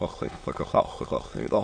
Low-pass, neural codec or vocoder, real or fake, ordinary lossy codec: 9.9 kHz; autoencoder, 22.05 kHz, a latent of 192 numbers a frame, VITS, trained on many speakers; fake; MP3, 48 kbps